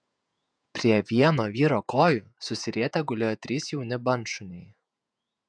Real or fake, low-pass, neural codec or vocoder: fake; 9.9 kHz; vocoder, 48 kHz, 128 mel bands, Vocos